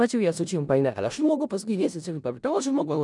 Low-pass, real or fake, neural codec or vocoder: 10.8 kHz; fake; codec, 16 kHz in and 24 kHz out, 0.4 kbps, LongCat-Audio-Codec, four codebook decoder